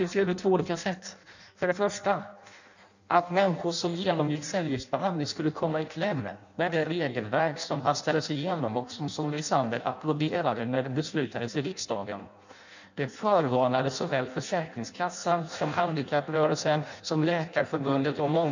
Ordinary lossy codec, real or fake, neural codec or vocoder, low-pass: none; fake; codec, 16 kHz in and 24 kHz out, 0.6 kbps, FireRedTTS-2 codec; 7.2 kHz